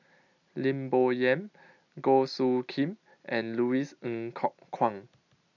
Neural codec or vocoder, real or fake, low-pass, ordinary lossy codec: none; real; 7.2 kHz; none